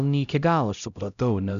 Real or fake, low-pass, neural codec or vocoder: fake; 7.2 kHz; codec, 16 kHz, 0.5 kbps, X-Codec, HuBERT features, trained on LibriSpeech